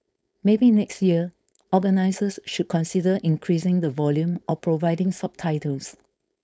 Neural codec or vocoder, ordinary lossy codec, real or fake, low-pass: codec, 16 kHz, 4.8 kbps, FACodec; none; fake; none